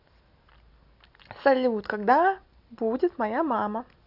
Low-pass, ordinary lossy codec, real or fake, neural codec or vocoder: 5.4 kHz; none; real; none